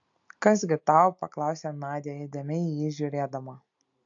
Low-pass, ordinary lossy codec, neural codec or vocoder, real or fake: 7.2 kHz; AAC, 64 kbps; none; real